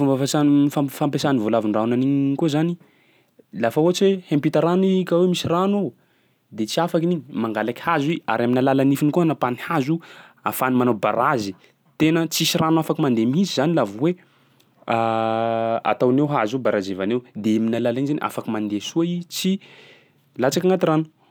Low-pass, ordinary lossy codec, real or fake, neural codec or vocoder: none; none; real; none